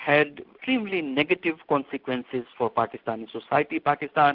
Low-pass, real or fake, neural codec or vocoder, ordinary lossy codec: 5.4 kHz; real; none; Opus, 24 kbps